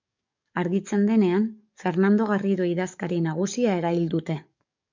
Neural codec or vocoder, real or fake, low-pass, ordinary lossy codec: codec, 44.1 kHz, 7.8 kbps, DAC; fake; 7.2 kHz; MP3, 64 kbps